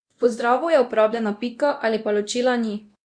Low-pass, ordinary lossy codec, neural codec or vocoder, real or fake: 9.9 kHz; Opus, 64 kbps; codec, 24 kHz, 0.9 kbps, DualCodec; fake